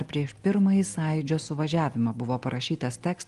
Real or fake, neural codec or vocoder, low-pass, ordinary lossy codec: real; none; 10.8 kHz; Opus, 24 kbps